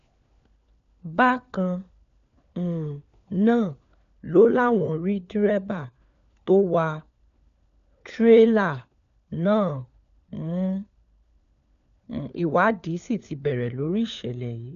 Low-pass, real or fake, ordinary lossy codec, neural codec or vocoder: 7.2 kHz; fake; none; codec, 16 kHz, 4 kbps, FunCodec, trained on LibriTTS, 50 frames a second